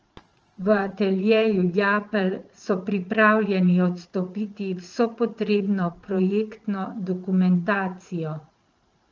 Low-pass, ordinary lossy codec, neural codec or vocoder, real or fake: 7.2 kHz; Opus, 24 kbps; vocoder, 44.1 kHz, 80 mel bands, Vocos; fake